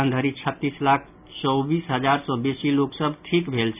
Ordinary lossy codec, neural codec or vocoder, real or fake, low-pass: none; none; real; 3.6 kHz